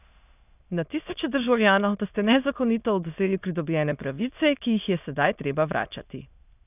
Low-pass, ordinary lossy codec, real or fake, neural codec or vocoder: 3.6 kHz; none; fake; autoencoder, 22.05 kHz, a latent of 192 numbers a frame, VITS, trained on many speakers